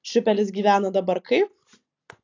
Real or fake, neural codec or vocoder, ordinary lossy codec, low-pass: real; none; AAC, 48 kbps; 7.2 kHz